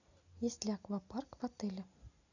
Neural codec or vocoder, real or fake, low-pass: none; real; 7.2 kHz